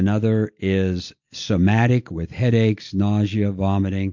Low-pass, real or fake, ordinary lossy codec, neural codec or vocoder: 7.2 kHz; real; MP3, 48 kbps; none